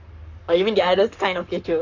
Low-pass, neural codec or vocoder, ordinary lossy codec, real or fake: 7.2 kHz; codec, 44.1 kHz, 7.8 kbps, Pupu-Codec; AAC, 48 kbps; fake